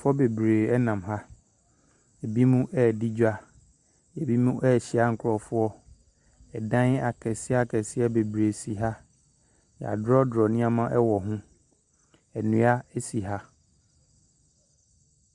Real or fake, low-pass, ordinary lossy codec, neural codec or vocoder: real; 10.8 kHz; Opus, 64 kbps; none